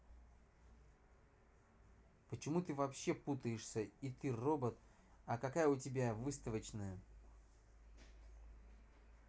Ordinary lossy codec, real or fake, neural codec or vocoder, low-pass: none; real; none; none